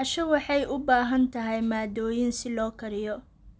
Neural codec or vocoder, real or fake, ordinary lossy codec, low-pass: none; real; none; none